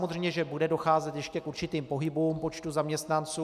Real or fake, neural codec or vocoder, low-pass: real; none; 14.4 kHz